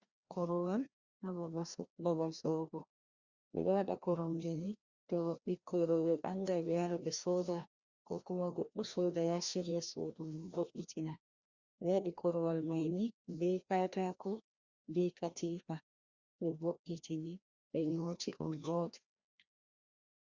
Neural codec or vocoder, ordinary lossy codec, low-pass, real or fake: codec, 16 kHz, 1 kbps, FreqCodec, larger model; Opus, 64 kbps; 7.2 kHz; fake